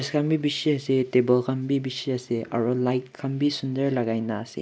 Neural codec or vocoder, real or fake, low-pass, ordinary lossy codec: none; real; none; none